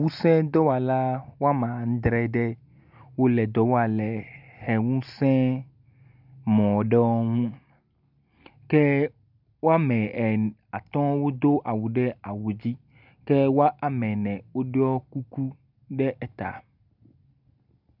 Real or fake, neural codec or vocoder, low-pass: real; none; 5.4 kHz